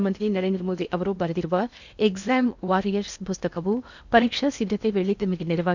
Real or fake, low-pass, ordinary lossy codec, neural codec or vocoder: fake; 7.2 kHz; none; codec, 16 kHz in and 24 kHz out, 0.8 kbps, FocalCodec, streaming, 65536 codes